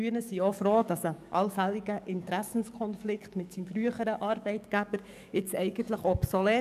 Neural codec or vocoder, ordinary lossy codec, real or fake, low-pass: codec, 44.1 kHz, 7.8 kbps, DAC; none; fake; 14.4 kHz